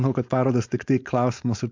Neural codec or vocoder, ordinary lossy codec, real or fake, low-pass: codec, 16 kHz, 4.8 kbps, FACodec; MP3, 64 kbps; fake; 7.2 kHz